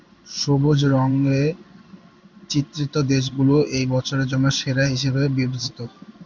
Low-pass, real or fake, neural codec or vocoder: 7.2 kHz; fake; codec, 16 kHz in and 24 kHz out, 1 kbps, XY-Tokenizer